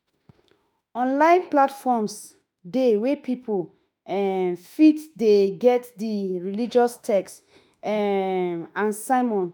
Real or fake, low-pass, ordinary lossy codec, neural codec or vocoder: fake; none; none; autoencoder, 48 kHz, 32 numbers a frame, DAC-VAE, trained on Japanese speech